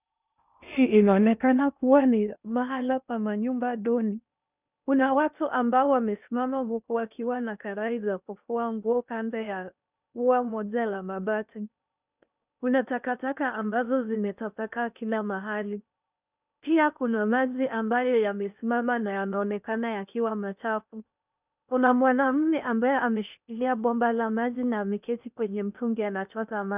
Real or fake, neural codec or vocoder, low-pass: fake; codec, 16 kHz in and 24 kHz out, 0.6 kbps, FocalCodec, streaming, 2048 codes; 3.6 kHz